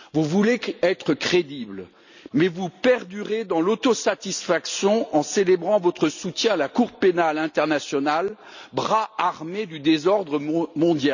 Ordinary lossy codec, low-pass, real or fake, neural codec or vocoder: none; 7.2 kHz; real; none